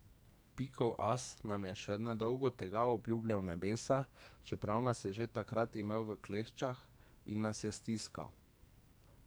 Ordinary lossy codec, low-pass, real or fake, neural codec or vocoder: none; none; fake; codec, 44.1 kHz, 2.6 kbps, SNAC